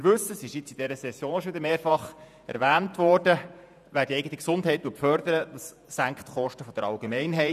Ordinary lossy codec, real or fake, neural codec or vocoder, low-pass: none; fake; vocoder, 48 kHz, 128 mel bands, Vocos; 14.4 kHz